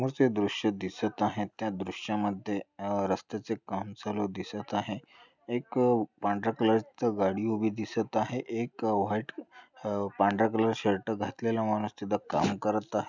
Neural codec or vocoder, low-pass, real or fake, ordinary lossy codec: none; 7.2 kHz; real; none